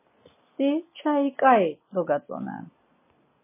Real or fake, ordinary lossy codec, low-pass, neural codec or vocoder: real; MP3, 16 kbps; 3.6 kHz; none